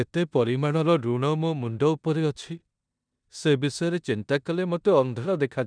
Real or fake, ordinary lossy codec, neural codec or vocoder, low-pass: fake; none; codec, 16 kHz in and 24 kHz out, 0.9 kbps, LongCat-Audio-Codec, four codebook decoder; 9.9 kHz